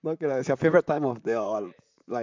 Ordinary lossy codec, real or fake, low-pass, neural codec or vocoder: MP3, 64 kbps; fake; 7.2 kHz; vocoder, 44.1 kHz, 128 mel bands, Pupu-Vocoder